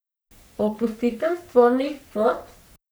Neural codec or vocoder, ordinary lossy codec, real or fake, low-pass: codec, 44.1 kHz, 3.4 kbps, Pupu-Codec; none; fake; none